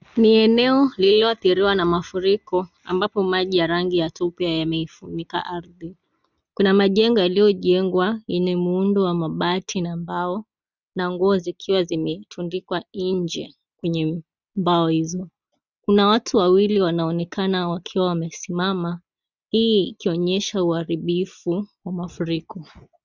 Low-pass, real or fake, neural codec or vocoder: 7.2 kHz; real; none